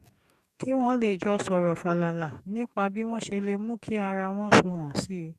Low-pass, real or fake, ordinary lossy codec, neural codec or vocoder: 14.4 kHz; fake; none; codec, 44.1 kHz, 2.6 kbps, DAC